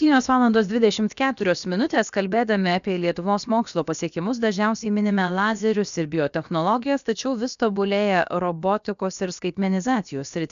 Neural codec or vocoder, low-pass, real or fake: codec, 16 kHz, about 1 kbps, DyCAST, with the encoder's durations; 7.2 kHz; fake